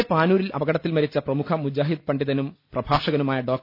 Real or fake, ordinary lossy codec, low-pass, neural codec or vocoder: real; none; 5.4 kHz; none